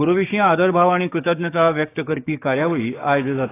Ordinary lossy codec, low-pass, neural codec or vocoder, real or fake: AAC, 16 kbps; 3.6 kHz; codec, 16 kHz, 6 kbps, DAC; fake